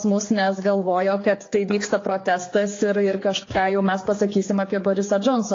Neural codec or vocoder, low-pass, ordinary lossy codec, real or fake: codec, 16 kHz, 4 kbps, X-Codec, HuBERT features, trained on general audio; 7.2 kHz; AAC, 32 kbps; fake